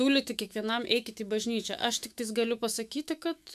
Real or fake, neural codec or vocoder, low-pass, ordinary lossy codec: fake; autoencoder, 48 kHz, 128 numbers a frame, DAC-VAE, trained on Japanese speech; 14.4 kHz; MP3, 96 kbps